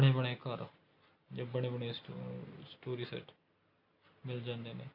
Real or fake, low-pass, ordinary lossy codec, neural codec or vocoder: real; 5.4 kHz; none; none